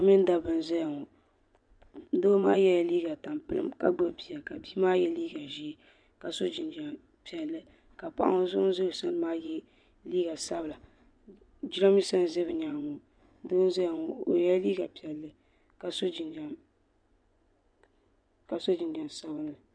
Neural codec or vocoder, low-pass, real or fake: vocoder, 24 kHz, 100 mel bands, Vocos; 9.9 kHz; fake